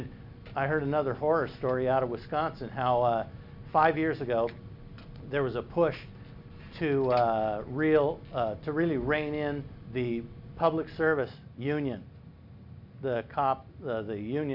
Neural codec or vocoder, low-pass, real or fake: none; 5.4 kHz; real